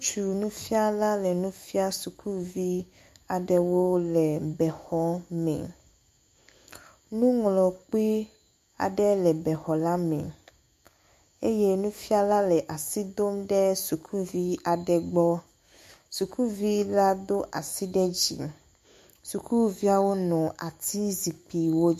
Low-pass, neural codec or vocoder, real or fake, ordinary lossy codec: 14.4 kHz; autoencoder, 48 kHz, 128 numbers a frame, DAC-VAE, trained on Japanese speech; fake; MP3, 64 kbps